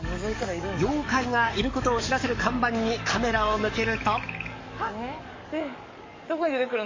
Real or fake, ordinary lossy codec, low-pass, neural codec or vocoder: fake; MP3, 32 kbps; 7.2 kHz; codec, 44.1 kHz, 7.8 kbps, DAC